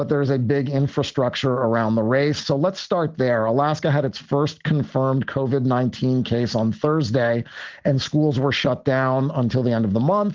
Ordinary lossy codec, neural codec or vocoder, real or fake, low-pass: Opus, 16 kbps; none; real; 7.2 kHz